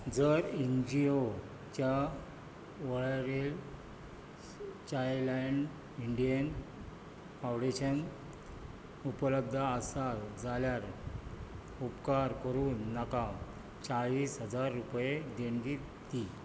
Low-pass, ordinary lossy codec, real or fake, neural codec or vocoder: none; none; real; none